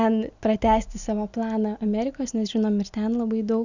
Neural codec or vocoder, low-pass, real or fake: none; 7.2 kHz; real